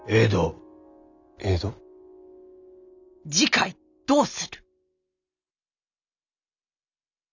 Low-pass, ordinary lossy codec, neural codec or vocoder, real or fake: 7.2 kHz; none; none; real